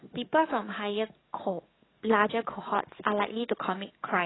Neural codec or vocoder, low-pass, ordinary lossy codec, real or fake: codec, 44.1 kHz, 7.8 kbps, DAC; 7.2 kHz; AAC, 16 kbps; fake